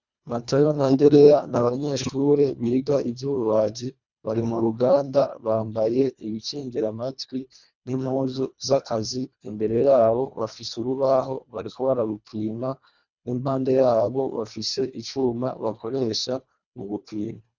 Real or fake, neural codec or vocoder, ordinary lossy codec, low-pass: fake; codec, 24 kHz, 1.5 kbps, HILCodec; Opus, 64 kbps; 7.2 kHz